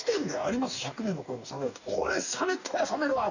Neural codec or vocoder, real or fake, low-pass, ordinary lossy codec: codec, 44.1 kHz, 2.6 kbps, DAC; fake; 7.2 kHz; none